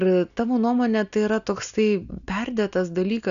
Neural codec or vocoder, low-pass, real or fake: none; 7.2 kHz; real